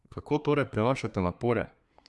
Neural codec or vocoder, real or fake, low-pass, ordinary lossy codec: codec, 24 kHz, 1 kbps, SNAC; fake; none; none